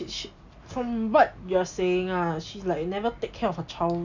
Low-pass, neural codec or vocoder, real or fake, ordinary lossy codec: 7.2 kHz; none; real; none